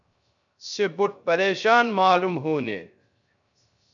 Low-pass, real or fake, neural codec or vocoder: 7.2 kHz; fake; codec, 16 kHz, 0.3 kbps, FocalCodec